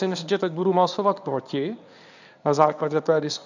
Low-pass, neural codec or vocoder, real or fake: 7.2 kHz; codec, 24 kHz, 0.9 kbps, WavTokenizer, medium speech release version 1; fake